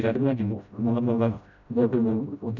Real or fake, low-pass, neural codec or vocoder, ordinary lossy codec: fake; 7.2 kHz; codec, 16 kHz, 0.5 kbps, FreqCodec, smaller model; none